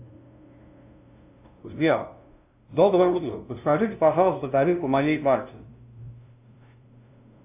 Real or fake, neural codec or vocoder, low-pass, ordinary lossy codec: fake; codec, 16 kHz, 0.5 kbps, FunCodec, trained on LibriTTS, 25 frames a second; 3.6 kHz; AAC, 32 kbps